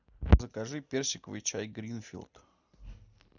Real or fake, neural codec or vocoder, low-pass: real; none; 7.2 kHz